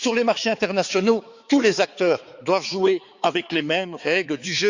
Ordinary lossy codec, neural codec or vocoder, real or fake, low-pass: Opus, 64 kbps; codec, 16 kHz, 4 kbps, X-Codec, HuBERT features, trained on balanced general audio; fake; 7.2 kHz